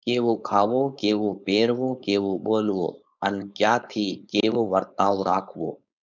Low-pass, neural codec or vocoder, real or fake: 7.2 kHz; codec, 16 kHz, 4.8 kbps, FACodec; fake